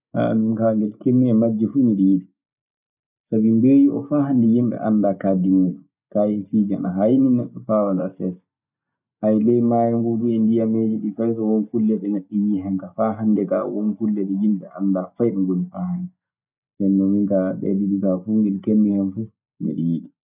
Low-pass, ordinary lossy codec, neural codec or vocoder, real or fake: 3.6 kHz; none; none; real